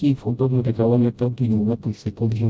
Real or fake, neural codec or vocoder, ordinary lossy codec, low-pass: fake; codec, 16 kHz, 0.5 kbps, FreqCodec, smaller model; none; none